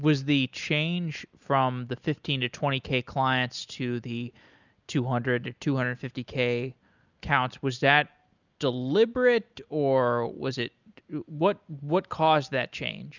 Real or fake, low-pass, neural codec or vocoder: real; 7.2 kHz; none